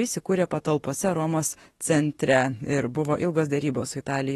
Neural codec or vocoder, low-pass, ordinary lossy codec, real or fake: autoencoder, 48 kHz, 32 numbers a frame, DAC-VAE, trained on Japanese speech; 19.8 kHz; AAC, 32 kbps; fake